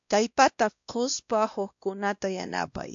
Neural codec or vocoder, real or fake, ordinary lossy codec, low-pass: codec, 16 kHz, 1 kbps, X-Codec, WavLM features, trained on Multilingual LibriSpeech; fake; AAC, 64 kbps; 7.2 kHz